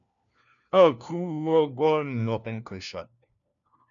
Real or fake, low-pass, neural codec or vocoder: fake; 7.2 kHz; codec, 16 kHz, 1 kbps, FunCodec, trained on LibriTTS, 50 frames a second